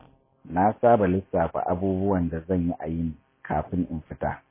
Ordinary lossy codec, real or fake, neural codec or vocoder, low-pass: MP3, 16 kbps; real; none; 3.6 kHz